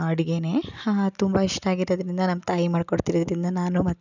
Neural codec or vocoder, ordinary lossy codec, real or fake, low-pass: none; none; real; 7.2 kHz